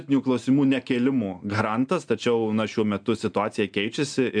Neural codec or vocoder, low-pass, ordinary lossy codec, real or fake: none; 9.9 kHz; MP3, 96 kbps; real